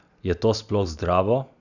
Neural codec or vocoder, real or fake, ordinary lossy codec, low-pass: none; real; none; 7.2 kHz